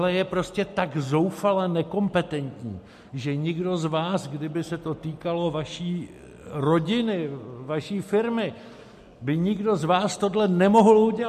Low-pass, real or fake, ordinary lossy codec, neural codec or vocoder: 14.4 kHz; real; MP3, 64 kbps; none